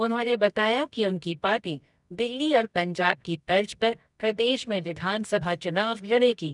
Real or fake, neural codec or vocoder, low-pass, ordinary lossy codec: fake; codec, 24 kHz, 0.9 kbps, WavTokenizer, medium music audio release; 10.8 kHz; none